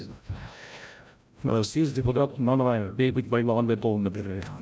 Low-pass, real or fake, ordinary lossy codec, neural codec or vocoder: none; fake; none; codec, 16 kHz, 0.5 kbps, FreqCodec, larger model